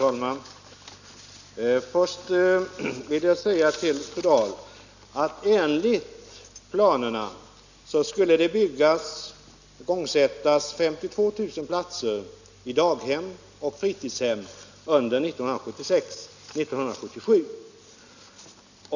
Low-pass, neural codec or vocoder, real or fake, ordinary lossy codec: 7.2 kHz; none; real; none